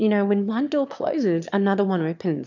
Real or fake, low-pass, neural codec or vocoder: fake; 7.2 kHz; autoencoder, 22.05 kHz, a latent of 192 numbers a frame, VITS, trained on one speaker